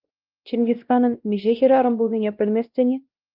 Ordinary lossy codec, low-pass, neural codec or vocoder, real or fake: Opus, 24 kbps; 5.4 kHz; codec, 16 kHz, 1 kbps, X-Codec, WavLM features, trained on Multilingual LibriSpeech; fake